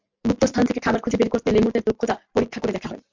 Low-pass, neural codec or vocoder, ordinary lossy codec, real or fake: 7.2 kHz; none; MP3, 64 kbps; real